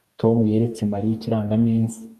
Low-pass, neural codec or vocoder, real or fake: 14.4 kHz; codec, 44.1 kHz, 2.6 kbps, DAC; fake